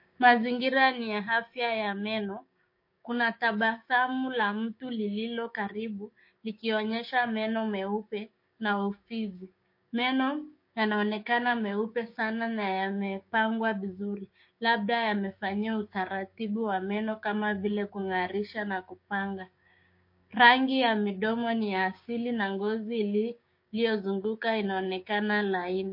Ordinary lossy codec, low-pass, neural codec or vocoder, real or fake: MP3, 32 kbps; 5.4 kHz; codec, 16 kHz, 6 kbps, DAC; fake